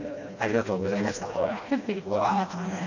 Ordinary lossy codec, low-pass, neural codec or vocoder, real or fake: none; 7.2 kHz; codec, 16 kHz, 1 kbps, FreqCodec, smaller model; fake